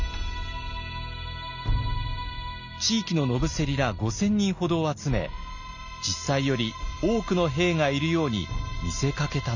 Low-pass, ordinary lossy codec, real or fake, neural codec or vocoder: 7.2 kHz; none; real; none